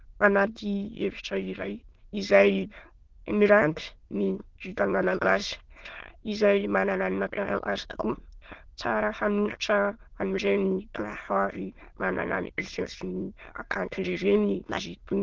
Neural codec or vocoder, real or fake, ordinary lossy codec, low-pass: autoencoder, 22.05 kHz, a latent of 192 numbers a frame, VITS, trained on many speakers; fake; Opus, 16 kbps; 7.2 kHz